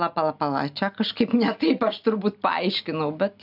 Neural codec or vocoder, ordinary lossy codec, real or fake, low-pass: none; AAC, 48 kbps; real; 5.4 kHz